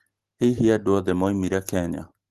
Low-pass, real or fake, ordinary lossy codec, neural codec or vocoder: 14.4 kHz; real; Opus, 16 kbps; none